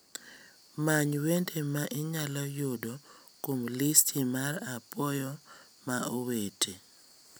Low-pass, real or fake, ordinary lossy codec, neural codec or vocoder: none; real; none; none